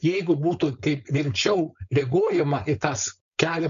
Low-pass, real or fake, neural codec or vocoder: 7.2 kHz; fake; codec, 16 kHz, 4.8 kbps, FACodec